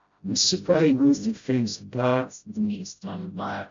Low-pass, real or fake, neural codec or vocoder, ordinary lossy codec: 7.2 kHz; fake; codec, 16 kHz, 0.5 kbps, FreqCodec, smaller model; MP3, 48 kbps